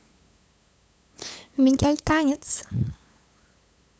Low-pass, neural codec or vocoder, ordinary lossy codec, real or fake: none; codec, 16 kHz, 8 kbps, FunCodec, trained on LibriTTS, 25 frames a second; none; fake